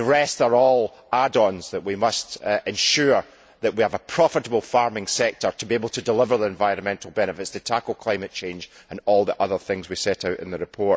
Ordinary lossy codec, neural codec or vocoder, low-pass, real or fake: none; none; none; real